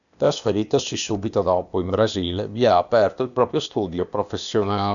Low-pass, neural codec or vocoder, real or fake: 7.2 kHz; codec, 16 kHz, 0.8 kbps, ZipCodec; fake